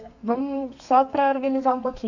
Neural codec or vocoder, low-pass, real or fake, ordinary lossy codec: codec, 32 kHz, 1.9 kbps, SNAC; 7.2 kHz; fake; none